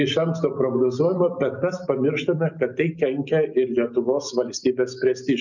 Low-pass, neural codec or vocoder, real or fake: 7.2 kHz; none; real